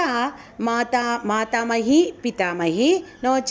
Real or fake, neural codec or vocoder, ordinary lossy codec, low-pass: real; none; none; none